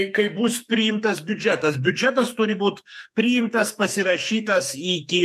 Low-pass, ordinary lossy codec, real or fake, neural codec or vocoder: 14.4 kHz; MP3, 96 kbps; fake; codec, 44.1 kHz, 2.6 kbps, SNAC